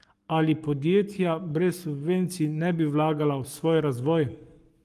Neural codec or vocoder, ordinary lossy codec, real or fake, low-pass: codec, 44.1 kHz, 7.8 kbps, Pupu-Codec; Opus, 24 kbps; fake; 14.4 kHz